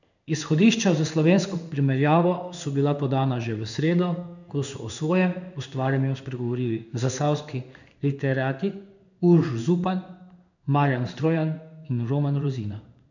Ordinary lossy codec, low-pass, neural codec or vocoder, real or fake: none; 7.2 kHz; codec, 16 kHz in and 24 kHz out, 1 kbps, XY-Tokenizer; fake